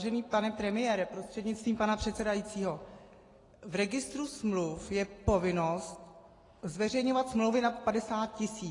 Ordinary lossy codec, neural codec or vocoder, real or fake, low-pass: AAC, 32 kbps; none; real; 10.8 kHz